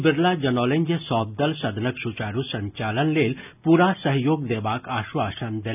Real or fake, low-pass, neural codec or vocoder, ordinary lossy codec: real; 3.6 kHz; none; none